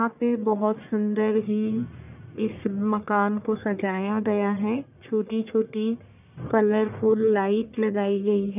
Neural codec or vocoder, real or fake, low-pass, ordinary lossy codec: codec, 44.1 kHz, 1.7 kbps, Pupu-Codec; fake; 3.6 kHz; none